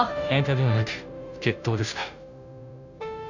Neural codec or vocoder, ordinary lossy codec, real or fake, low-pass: codec, 16 kHz, 0.5 kbps, FunCodec, trained on Chinese and English, 25 frames a second; none; fake; 7.2 kHz